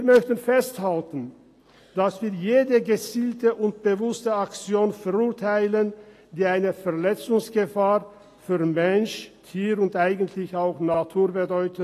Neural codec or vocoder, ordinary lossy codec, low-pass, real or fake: none; AAC, 48 kbps; 14.4 kHz; real